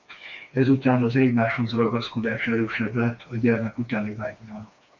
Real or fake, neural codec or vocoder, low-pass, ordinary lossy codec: fake; codec, 16 kHz, 2 kbps, FreqCodec, smaller model; 7.2 kHz; MP3, 48 kbps